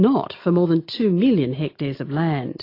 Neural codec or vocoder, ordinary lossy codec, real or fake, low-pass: none; AAC, 32 kbps; real; 5.4 kHz